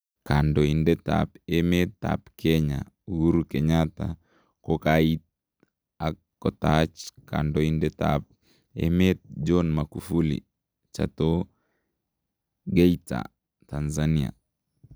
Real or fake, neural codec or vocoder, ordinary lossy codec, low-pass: real; none; none; none